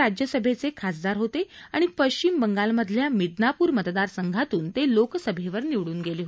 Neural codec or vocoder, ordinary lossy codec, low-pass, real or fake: none; none; none; real